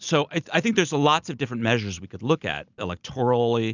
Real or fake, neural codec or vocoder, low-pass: real; none; 7.2 kHz